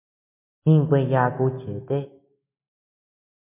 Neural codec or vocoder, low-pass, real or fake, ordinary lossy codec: none; 3.6 kHz; real; MP3, 32 kbps